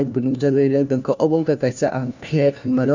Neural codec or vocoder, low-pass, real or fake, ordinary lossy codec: codec, 16 kHz, 1 kbps, FunCodec, trained on LibriTTS, 50 frames a second; 7.2 kHz; fake; none